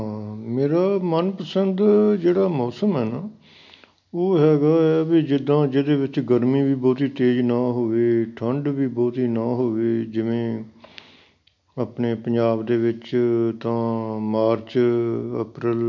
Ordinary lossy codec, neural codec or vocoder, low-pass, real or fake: MP3, 64 kbps; none; 7.2 kHz; real